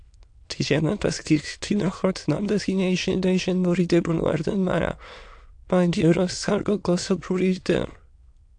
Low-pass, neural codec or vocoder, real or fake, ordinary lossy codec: 9.9 kHz; autoencoder, 22.05 kHz, a latent of 192 numbers a frame, VITS, trained on many speakers; fake; AAC, 64 kbps